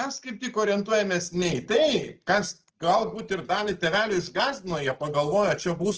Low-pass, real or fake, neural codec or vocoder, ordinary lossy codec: 7.2 kHz; real; none; Opus, 16 kbps